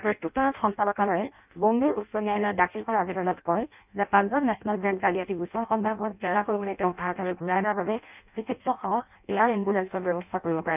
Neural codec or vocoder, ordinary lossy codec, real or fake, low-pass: codec, 16 kHz in and 24 kHz out, 0.6 kbps, FireRedTTS-2 codec; none; fake; 3.6 kHz